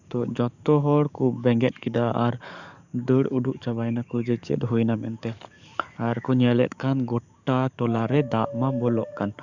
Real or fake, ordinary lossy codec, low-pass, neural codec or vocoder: fake; none; 7.2 kHz; codec, 44.1 kHz, 7.8 kbps, DAC